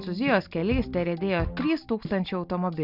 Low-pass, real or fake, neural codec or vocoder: 5.4 kHz; real; none